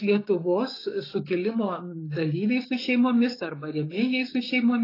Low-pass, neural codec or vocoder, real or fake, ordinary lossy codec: 5.4 kHz; codec, 16 kHz, 6 kbps, DAC; fake; AAC, 24 kbps